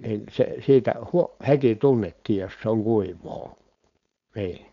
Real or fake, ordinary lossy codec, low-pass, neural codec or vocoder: fake; none; 7.2 kHz; codec, 16 kHz, 4.8 kbps, FACodec